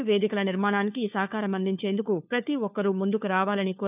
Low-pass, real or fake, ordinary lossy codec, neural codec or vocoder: 3.6 kHz; fake; none; codec, 16 kHz, 4 kbps, FunCodec, trained on LibriTTS, 50 frames a second